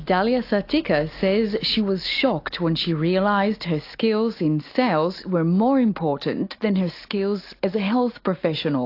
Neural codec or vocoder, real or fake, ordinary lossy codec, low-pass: none; real; AAC, 32 kbps; 5.4 kHz